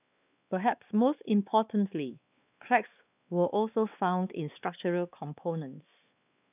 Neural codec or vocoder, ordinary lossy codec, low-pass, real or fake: codec, 16 kHz, 2 kbps, X-Codec, WavLM features, trained on Multilingual LibriSpeech; none; 3.6 kHz; fake